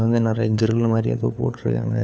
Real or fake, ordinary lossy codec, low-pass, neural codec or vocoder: fake; none; none; codec, 16 kHz, 4 kbps, FunCodec, trained on LibriTTS, 50 frames a second